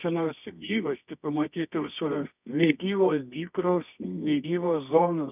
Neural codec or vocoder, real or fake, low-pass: codec, 24 kHz, 0.9 kbps, WavTokenizer, medium music audio release; fake; 3.6 kHz